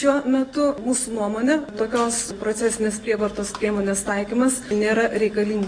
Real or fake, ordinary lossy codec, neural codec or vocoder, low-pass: real; MP3, 64 kbps; none; 9.9 kHz